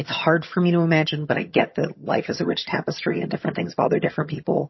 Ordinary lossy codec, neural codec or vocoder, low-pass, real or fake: MP3, 24 kbps; vocoder, 22.05 kHz, 80 mel bands, HiFi-GAN; 7.2 kHz; fake